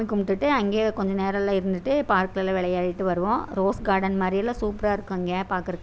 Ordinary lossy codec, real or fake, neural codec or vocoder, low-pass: none; real; none; none